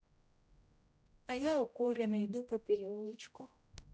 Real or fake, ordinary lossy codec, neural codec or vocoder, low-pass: fake; none; codec, 16 kHz, 0.5 kbps, X-Codec, HuBERT features, trained on general audio; none